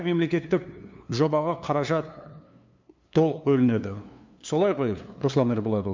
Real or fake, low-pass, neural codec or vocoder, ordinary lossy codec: fake; 7.2 kHz; codec, 16 kHz, 2 kbps, FunCodec, trained on LibriTTS, 25 frames a second; MP3, 64 kbps